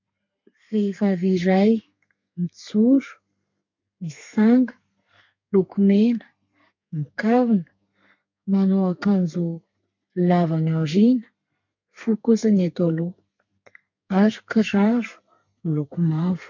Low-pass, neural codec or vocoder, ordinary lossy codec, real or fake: 7.2 kHz; codec, 32 kHz, 1.9 kbps, SNAC; MP3, 48 kbps; fake